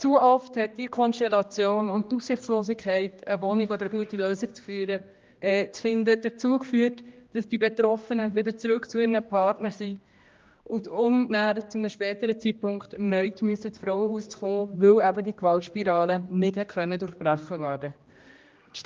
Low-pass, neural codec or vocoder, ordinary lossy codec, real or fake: 7.2 kHz; codec, 16 kHz, 1 kbps, X-Codec, HuBERT features, trained on general audio; Opus, 32 kbps; fake